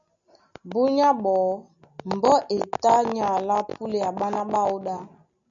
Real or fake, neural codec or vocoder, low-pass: real; none; 7.2 kHz